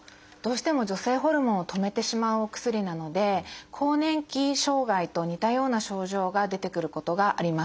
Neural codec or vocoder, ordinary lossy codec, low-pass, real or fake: none; none; none; real